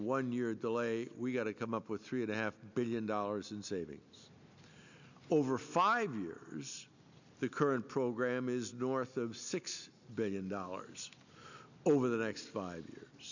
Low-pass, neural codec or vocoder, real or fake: 7.2 kHz; none; real